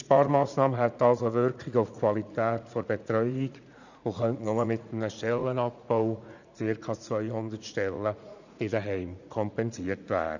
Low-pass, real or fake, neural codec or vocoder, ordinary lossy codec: 7.2 kHz; fake; vocoder, 44.1 kHz, 80 mel bands, Vocos; none